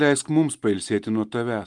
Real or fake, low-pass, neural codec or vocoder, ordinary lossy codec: real; 10.8 kHz; none; Opus, 32 kbps